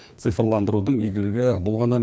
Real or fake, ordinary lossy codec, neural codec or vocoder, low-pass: fake; none; codec, 16 kHz, 2 kbps, FreqCodec, larger model; none